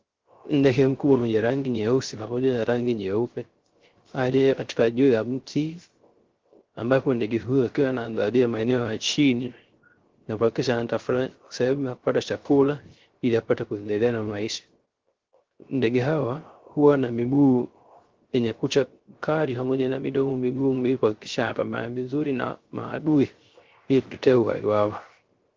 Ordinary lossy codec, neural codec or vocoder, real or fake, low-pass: Opus, 16 kbps; codec, 16 kHz, 0.3 kbps, FocalCodec; fake; 7.2 kHz